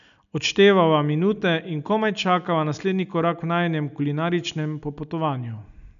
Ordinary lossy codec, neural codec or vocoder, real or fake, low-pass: none; none; real; 7.2 kHz